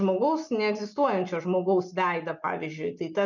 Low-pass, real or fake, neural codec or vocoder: 7.2 kHz; real; none